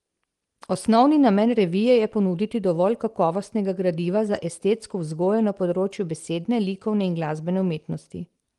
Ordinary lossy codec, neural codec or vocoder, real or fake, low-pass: Opus, 24 kbps; none; real; 10.8 kHz